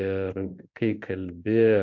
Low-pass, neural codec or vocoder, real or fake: 7.2 kHz; codec, 16 kHz in and 24 kHz out, 1 kbps, XY-Tokenizer; fake